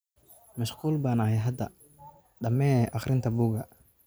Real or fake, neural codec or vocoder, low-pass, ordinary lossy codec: real; none; none; none